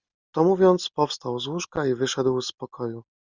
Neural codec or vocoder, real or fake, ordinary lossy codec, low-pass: none; real; Opus, 64 kbps; 7.2 kHz